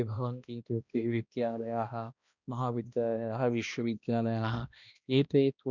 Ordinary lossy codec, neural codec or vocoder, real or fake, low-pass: none; codec, 16 kHz, 1 kbps, X-Codec, HuBERT features, trained on balanced general audio; fake; 7.2 kHz